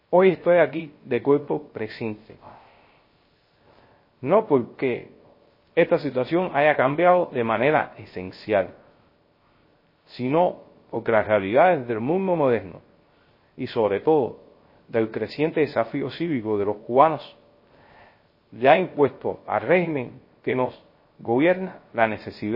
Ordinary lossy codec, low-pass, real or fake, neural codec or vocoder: MP3, 24 kbps; 5.4 kHz; fake; codec, 16 kHz, 0.3 kbps, FocalCodec